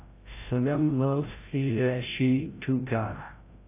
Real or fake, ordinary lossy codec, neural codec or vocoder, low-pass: fake; AAC, 24 kbps; codec, 16 kHz, 0.5 kbps, FreqCodec, larger model; 3.6 kHz